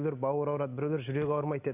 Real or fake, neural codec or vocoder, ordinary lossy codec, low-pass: real; none; none; 3.6 kHz